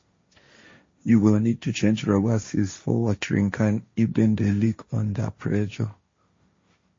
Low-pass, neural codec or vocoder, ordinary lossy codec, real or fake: 7.2 kHz; codec, 16 kHz, 1.1 kbps, Voila-Tokenizer; MP3, 32 kbps; fake